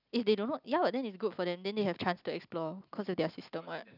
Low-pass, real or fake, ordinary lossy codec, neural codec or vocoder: 5.4 kHz; real; none; none